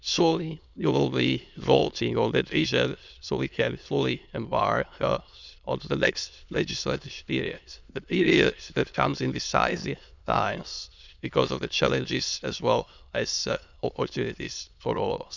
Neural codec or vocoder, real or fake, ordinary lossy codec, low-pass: autoencoder, 22.05 kHz, a latent of 192 numbers a frame, VITS, trained on many speakers; fake; none; 7.2 kHz